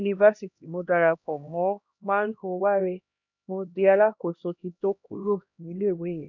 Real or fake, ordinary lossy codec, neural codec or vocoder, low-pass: fake; none; codec, 16 kHz, 1 kbps, X-Codec, HuBERT features, trained on LibriSpeech; 7.2 kHz